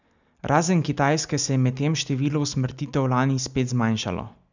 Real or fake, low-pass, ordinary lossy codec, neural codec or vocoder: real; 7.2 kHz; none; none